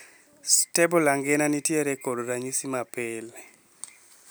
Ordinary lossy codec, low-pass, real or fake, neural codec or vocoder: none; none; real; none